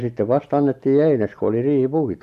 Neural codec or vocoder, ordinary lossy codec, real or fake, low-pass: none; none; real; 14.4 kHz